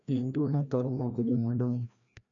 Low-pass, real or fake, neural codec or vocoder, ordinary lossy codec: 7.2 kHz; fake; codec, 16 kHz, 1 kbps, FreqCodec, larger model; none